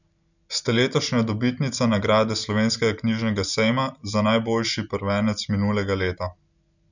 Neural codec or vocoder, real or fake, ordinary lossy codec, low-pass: none; real; none; 7.2 kHz